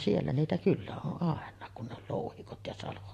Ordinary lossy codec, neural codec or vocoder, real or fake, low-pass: AAC, 48 kbps; vocoder, 44.1 kHz, 128 mel bands every 512 samples, BigVGAN v2; fake; 14.4 kHz